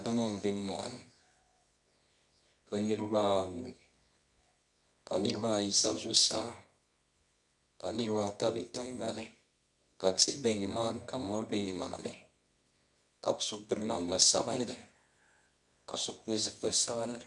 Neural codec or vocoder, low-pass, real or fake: codec, 24 kHz, 0.9 kbps, WavTokenizer, medium music audio release; 10.8 kHz; fake